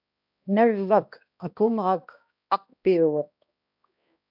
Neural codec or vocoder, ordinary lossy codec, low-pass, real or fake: codec, 16 kHz, 1 kbps, X-Codec, HuBERT features, trained on balanced general audio; AAC, 48 kbps; 5.4 kHz; fake